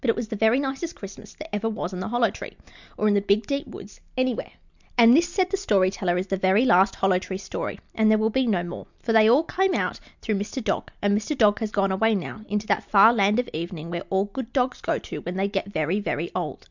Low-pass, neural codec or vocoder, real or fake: 7.2 kHz; none; real